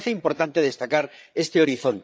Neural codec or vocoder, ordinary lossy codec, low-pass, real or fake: codec, 16 kHz, 8 kbps, FreqCodec, larger model; none; none; fake